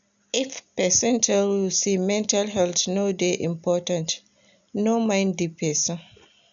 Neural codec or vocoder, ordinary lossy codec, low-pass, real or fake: none; none; 7.2 kHz; real